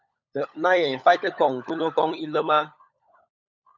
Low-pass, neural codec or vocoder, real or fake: 7.2 kHz; codec, 16 kHz, 16 kbps, FunCodec, trained on LibriTTS, 50 frames a second; fake